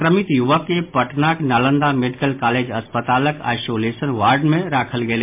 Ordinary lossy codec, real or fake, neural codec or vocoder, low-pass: MP3, 32 kbps; real; none; 3.6 kHz